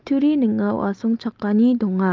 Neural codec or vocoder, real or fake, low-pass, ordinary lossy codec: none; real; 7.2 kHz; Opus, 32 kbps